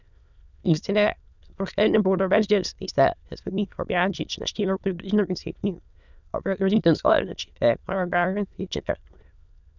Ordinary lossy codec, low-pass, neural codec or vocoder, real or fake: none; 7.2 kHz; autoencoder, 22.05 kHz, a latent of 192 numbers a frame, VITS, trained on many speakers; fake